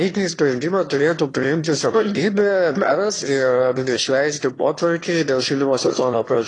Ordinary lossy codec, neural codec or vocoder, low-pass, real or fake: AAC, 32 kbps; autoencoder, 22.05 kHz, a latent of 192 numbers a frame, VITS, trained on one speaker; 9.9 kHz; fake